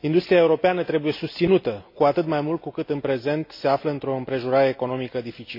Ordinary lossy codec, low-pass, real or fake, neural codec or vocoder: MP3, 32 kbps; 5.4 kHz; real; none